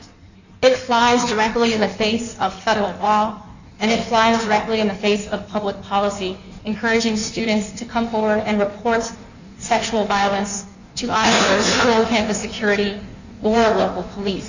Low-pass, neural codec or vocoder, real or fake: 7.2 kHz; codec, 16 kHz in and 24 kHz out, 1.1 kbps, FireRedTTS-2 codec; fake